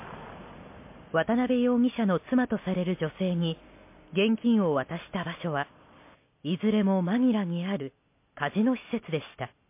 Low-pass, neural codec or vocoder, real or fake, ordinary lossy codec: 3.6 kHz; none; real; MP3, 24 kbps